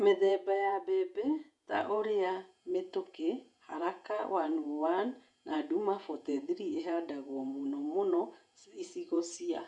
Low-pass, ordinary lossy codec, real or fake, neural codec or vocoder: 10.8 kHz; none; fake; vocoder, 48 kHz, 128 mel bands, Vocos